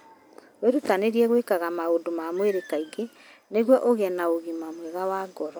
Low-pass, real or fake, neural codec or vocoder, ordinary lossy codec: none; real; none; none